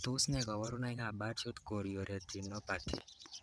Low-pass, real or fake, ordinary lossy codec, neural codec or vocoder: none; fake; none; vocoder, 22.05 kHz, 80 mel bands, WaveNeXt